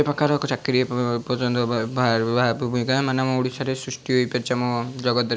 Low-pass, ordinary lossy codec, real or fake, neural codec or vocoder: none; none; real; none